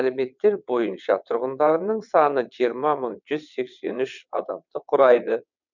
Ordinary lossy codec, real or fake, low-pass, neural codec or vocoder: none; fake; 7.2 kHz; vocoder, 22.05 kHz, 80 mel bands, WaveNeXt